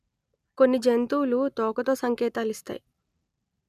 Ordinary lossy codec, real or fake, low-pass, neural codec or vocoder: none; real; 14.4 kHz; none